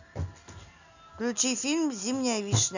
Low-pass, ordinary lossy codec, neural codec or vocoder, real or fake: 7.2 kHz; none; none; real